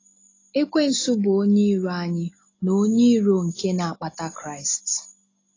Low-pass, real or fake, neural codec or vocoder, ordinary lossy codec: 7.2 kHz; real; none; AAC, 32 kbps